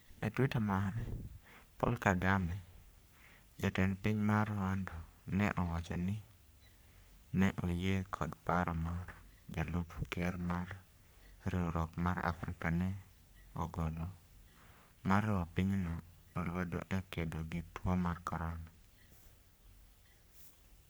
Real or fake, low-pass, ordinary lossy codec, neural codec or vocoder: fake; none; none; codec, 44.1 kHz, 3.4 kbps, Pupu-Codec